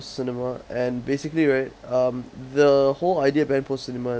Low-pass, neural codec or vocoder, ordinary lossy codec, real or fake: none; none; none; real